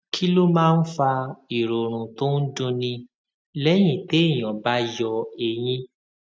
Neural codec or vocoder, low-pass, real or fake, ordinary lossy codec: none; none; real; none